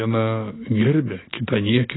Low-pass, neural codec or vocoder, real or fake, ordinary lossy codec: 7.2 kHz; none; real; AAC, 16 kbps